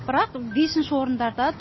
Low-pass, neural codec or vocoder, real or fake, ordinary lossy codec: 7.2 kHz; none; real; MP3, 24 kbps